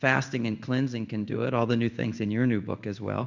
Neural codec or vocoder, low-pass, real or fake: codec, 16 kHz in and 24 kHz out, 1 kbps, XY-Tokenizer; 7.2 kHz; fake